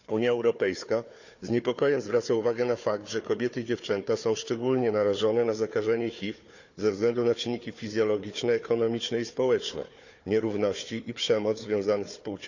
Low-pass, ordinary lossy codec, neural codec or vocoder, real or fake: 7.2 kHz; none; codec, 16 kHz, 4 kbps, FunCodec, trained on Chinese and English, 50 frames a second; fake